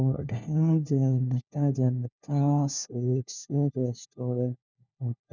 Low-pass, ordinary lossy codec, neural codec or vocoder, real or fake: 7.2 kHz; none; codec, 16 kHz, 1 kbps, FunCodec, trained on LibriTTS, 50 frames a second; fake